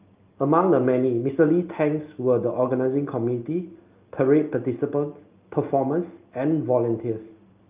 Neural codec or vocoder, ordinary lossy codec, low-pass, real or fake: none; Opus, 24 kbps; 3.6 kHz; real